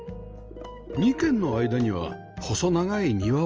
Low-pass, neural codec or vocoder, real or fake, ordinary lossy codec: 7.2 kHz; none; real; Opus, 24 kbps